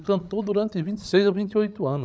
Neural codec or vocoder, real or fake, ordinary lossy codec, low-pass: codec, 16 kHz, 16 kbps, FreqCodec, larger model; fake; none; none